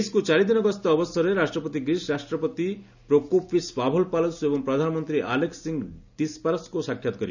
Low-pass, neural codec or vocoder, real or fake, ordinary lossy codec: 7.2 kHz; none; real; none